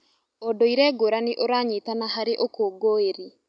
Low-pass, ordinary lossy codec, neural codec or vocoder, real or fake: 9.9 kHz; none; none; real